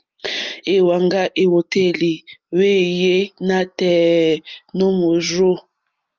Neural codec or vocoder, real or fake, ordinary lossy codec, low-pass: none; real; Opus, 32 kbps; 7.2 kHz